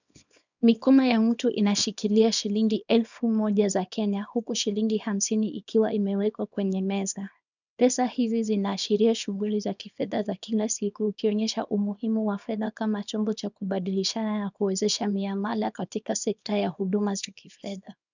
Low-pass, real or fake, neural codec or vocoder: 7.2 kHz; fake; codec, 24 kHz, 0.9 kbps, WavTokenizer, small release